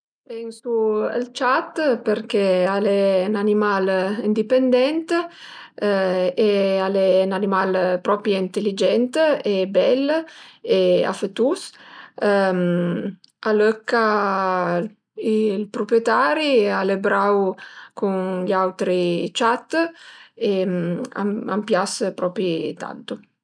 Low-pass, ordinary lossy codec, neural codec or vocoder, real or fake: 9.9 kHz; none; none; real